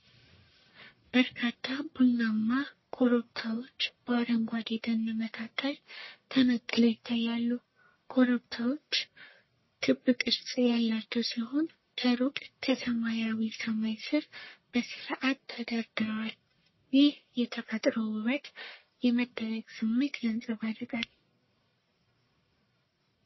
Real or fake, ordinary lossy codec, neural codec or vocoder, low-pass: fake; MP3, 24 kbps; codec, 44.1 kHz, 1.7 kbps, Pupu-Codec; 7.2 kHz